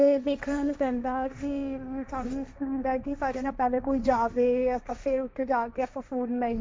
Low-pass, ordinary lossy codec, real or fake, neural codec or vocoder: none; none; fake; codec, 16 kHz, 1.1 kbps, Voila-Tokenizer